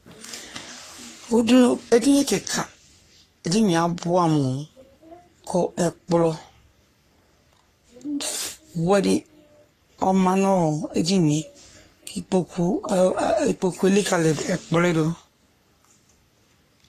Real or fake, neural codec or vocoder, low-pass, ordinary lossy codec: fake; codec, 44.1 kHz, 3.4 kbps, Pupu-Codec; 14.4 kHz; AAC, 48 kbps